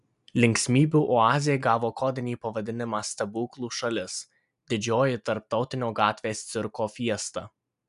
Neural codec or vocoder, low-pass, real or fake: none; 10.8 kHz; real